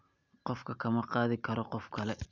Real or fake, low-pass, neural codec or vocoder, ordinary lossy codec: real; 7.2 kHz; none; none